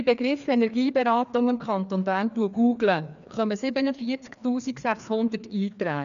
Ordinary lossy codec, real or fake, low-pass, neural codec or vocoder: none; fake; 7.2 kHz; codec, 16 kHz, 2 kbps, FreqCodec, larger model